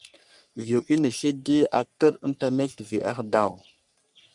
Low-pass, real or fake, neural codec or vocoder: 10.8 kHz; fake; codec, 44.1 kHz, 3.4 kbps, Pupu-Codec